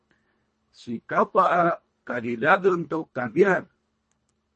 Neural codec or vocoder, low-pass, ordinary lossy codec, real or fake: codec, 24 kHz, 1.5 kbps, HILCodec; 10.8 kHz; MP3, 32 kbps; fake